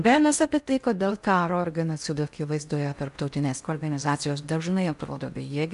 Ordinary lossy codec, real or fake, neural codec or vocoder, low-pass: AAC, 96 kbps; fake; codec, 16 kHz in and 24 kHz out, 0.6 kbps, FocalCodec, streaming, 4096 codes; 10.8 kHz